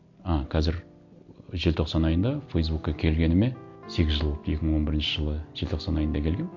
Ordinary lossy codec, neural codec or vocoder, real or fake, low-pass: MP3, 48 kbps; none; real; 7.2 kHz